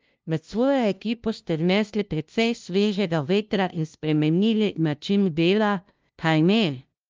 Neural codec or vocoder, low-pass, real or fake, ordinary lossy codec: codec, 16 kHz, 0.5 kbps, FunCodec, trained on LibriTTS, 25 frames a second; 7.2 kHz; fake; Opus, 24 kbps